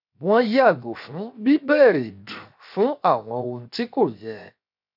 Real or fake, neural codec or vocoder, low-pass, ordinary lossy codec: fake; codec, 16 kHz, 0.7 kbps, FocalCodec; 5.4 kHz; none